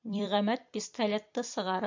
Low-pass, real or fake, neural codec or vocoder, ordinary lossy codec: 7.2 kHz; fake; vocoder, 44.1 kHz, 128 mel bands every 256 samples, BigVGAN v2; MP3, 64 kbps